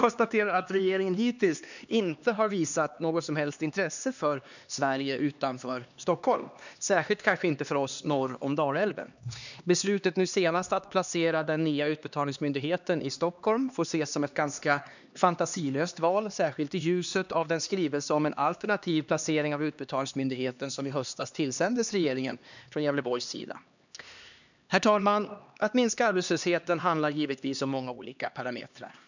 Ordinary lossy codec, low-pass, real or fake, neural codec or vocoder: none; 7.2 kHz; fake; codec, 16 kHz, 2 kbps, X-Codec, HuBERT features, trained on LibriSpeech